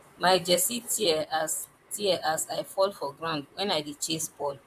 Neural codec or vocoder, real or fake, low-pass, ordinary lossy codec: vocoder, 44.1 kHz, 128 mel bands, Pupu-Vocoder; fake; 14.4 kHz; MP3, 96 kbps